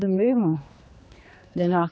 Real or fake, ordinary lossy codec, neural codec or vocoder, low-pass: fake; none; codec, 16 kHz, 2 kbps, X-Codec, HuBERT features, trained on general audio; none